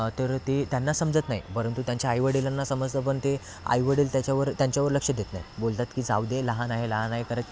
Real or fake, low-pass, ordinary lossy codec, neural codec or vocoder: real; none; none; none